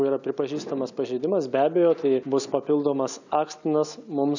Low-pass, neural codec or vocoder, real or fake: 7.2 kHz; none; real